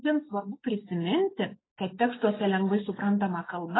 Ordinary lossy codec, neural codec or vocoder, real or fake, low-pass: AAC, 16 kbps; none; real; 7.2 kHz